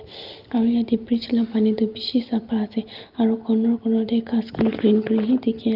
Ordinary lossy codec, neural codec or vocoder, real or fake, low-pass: Opus, 32 kbps; none; real; 5.4 kHz